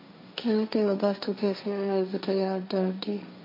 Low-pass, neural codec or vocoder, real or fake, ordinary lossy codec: 5.4 kHz; codec, 16 kHz, 1.1 kbps, Voila-Tokenizer; fake; MP3, 32 kbps